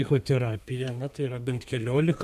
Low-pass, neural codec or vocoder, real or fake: 14.4 kHz; codec, 32 kHz, 1.9 kbps, SNAC; fake